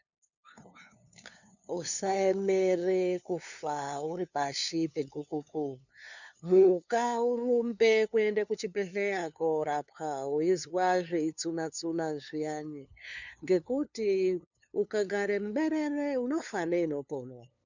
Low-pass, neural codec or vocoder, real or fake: 7.2 kHz; codec, 16 kHz, 2 kbps, FunCodec, trained on LibriTTS, 25 frames a second; fake